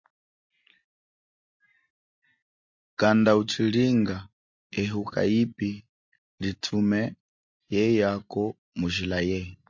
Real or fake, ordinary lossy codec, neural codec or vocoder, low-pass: real; MP3, 48 kbps; none; 7.2 kHz